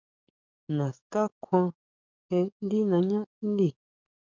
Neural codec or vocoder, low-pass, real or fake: codec, 44.1 kHz, 7.8 kbps, DAC; 7.2 kHz; fake